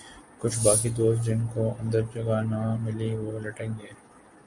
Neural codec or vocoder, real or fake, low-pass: none; real; 10.8 kHz